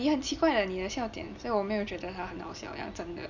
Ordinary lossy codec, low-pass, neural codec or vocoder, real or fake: none; 7.2 kHz; none; real